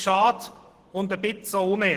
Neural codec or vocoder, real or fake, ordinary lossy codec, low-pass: vocoder, 44.1 kHz, 128 mel bands every 512 samples, BigVGAN v2; fake; Opus, 16 kbps; 14.4 kHz